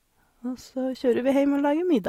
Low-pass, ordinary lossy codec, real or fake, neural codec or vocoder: 19.8 kHz; AAC, 48 kbps; real; none